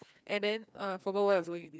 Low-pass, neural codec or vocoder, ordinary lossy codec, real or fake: none; codec, 16 kHz, 2 kbps, FreqCodec, larger model; none; fake